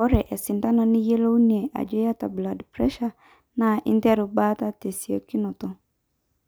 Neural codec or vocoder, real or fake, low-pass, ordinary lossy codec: none; real; none; none